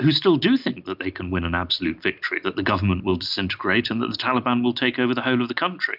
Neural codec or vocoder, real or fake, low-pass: none; real; 5.4 kHz